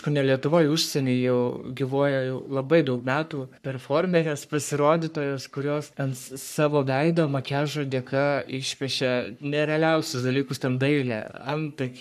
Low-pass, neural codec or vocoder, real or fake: 14.4 kHz; codec, 44.1 kHz, 3.4 kbps, Pupu-Codec; fake